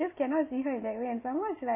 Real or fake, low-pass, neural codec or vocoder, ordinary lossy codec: real; 3.6 kHz; none; none